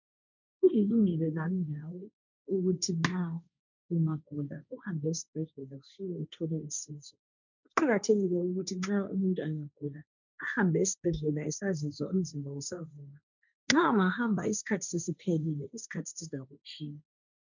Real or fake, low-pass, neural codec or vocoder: fake; 7.2 kHz; codec, 16 kHz, 1.1 kbps, Voila-Tokenizer